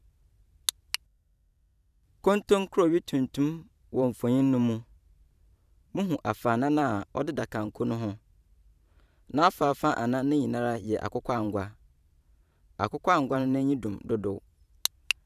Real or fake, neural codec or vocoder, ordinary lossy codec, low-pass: fake; vocoder, 44.1 kHz, 128 mel bands every 512 samples, BigVGAN v2; none; 14.4 kHz